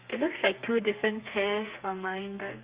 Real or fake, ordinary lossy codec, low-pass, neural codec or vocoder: fake; Opus, 64 kbps; 3.6 kHz; codec, 32 kHz, 1.9 kbps, SNAC